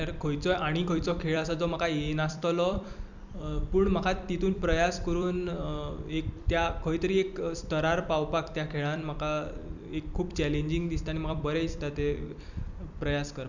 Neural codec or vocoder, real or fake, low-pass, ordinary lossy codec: vocoder, 44.1 kHz, 128 mel bands every 256 samples, BigVGAN v2; fake; 7.2 kHz; none